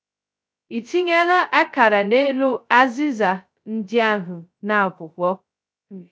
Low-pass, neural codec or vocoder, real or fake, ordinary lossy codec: none; codec, 16 kHz, 0.2 kbps, FocalCodec; fake; none